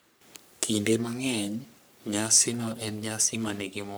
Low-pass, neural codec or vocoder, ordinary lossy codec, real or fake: none; codec, 44.1 kHz, 3.4 kbps, Pupu-Codec; none; fake